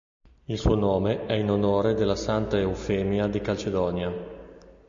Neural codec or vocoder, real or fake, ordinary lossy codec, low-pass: none; real; MP3, 48 kbps; 7.2 kHz